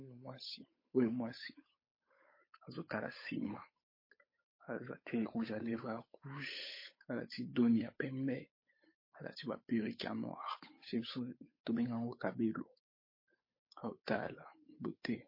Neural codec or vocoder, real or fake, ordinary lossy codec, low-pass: codec, 16 kHz, 8 kbps, FunCodec, trained on Chinese and English, 25 frames a second; fake; MP3, 24 kbps; 5.4 kHz